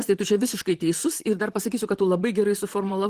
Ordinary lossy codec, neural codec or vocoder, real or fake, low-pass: Opus, 16 kbps; vocoder, 44.1 kHz, 128 mel bands, Pupu-Vocoder; fake; 14.4 kHz